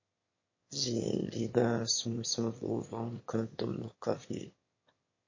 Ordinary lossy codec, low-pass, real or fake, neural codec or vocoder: MP3, 32 kbps; 7.2 kHz; fake; autoencoder, 22.05 kHz, a latent of 192 numbers a frame, VITS, trained on one speaker